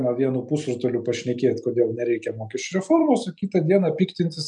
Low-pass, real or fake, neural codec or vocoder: 10.8 kHz; real; none